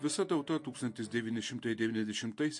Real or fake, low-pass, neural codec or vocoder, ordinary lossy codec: real; 10.8 kHz; none; MP3, 48 kbps